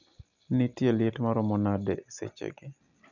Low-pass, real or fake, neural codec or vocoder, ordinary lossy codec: 7.2 kHz; real; none; none